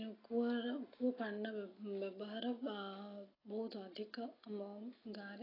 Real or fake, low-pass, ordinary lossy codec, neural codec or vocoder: real; 5.4 kHz; AAC, 24 kbps; none